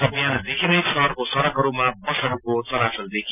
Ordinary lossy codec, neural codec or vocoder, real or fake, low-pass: none; none; real; 3.6 kHz